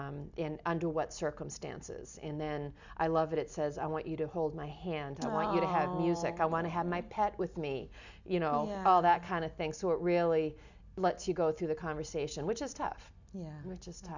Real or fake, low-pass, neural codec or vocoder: real; 7.2 kHz; none